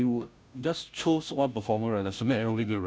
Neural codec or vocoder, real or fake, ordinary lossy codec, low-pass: codec, 16 kHz, 0.5 kbps, FunCodec, trained on Chinese and English, 25 frames a second; fake; none; none